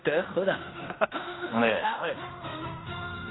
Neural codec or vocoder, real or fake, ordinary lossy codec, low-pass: codec, 16 kHz in and 24 kHz out, 0.9 kbps, LongCat-Audio-Codec, fine tuned four codebook decoder; fake; AAC, 16 kbps; 7.2 kHz